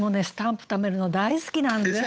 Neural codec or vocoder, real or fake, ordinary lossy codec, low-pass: codec, 16 kHz, 8 kbps, FunCodec, trained on Chinese and English, 25 frames a second; fake; none; none